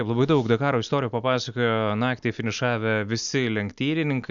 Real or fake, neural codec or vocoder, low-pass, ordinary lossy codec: real; none; 7.2 kHz; MP3, 96 kbps